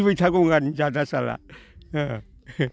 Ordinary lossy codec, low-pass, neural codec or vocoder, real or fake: none; none; none; real